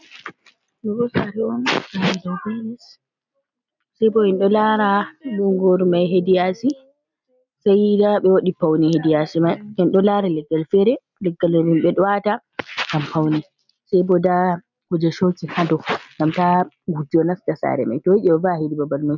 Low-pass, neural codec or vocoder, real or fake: 7.2 kHz; none; real